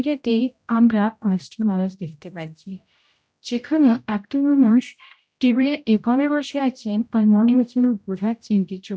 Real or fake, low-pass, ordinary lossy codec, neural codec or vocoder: fake; none; none; codec, 16 kHz, 0.5 kbps, X-Codec, HuBERT features, trained on general audio